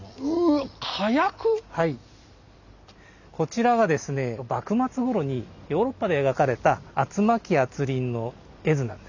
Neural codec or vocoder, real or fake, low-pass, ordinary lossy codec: none; real; 7.2 kHz; none